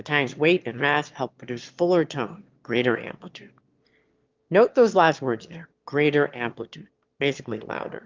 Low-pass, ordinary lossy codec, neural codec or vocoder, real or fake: 7.2 kHz; Opus, 32 kbps; autoencoder, 22.05 kHz, a latent of 192 numbers a frame, VITS, trained on one speaker; fake